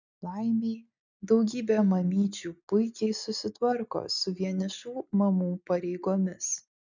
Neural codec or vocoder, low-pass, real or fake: none; 7.2 kHz; real